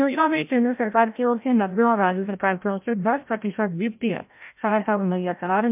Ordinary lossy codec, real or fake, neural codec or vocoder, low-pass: MP3, 32 kbps; fake; codec, 16 kHz, 0.5 kbps, FreqCodec, larger model; 3.6 kHz